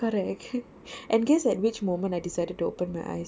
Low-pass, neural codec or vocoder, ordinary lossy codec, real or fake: none; none; none; real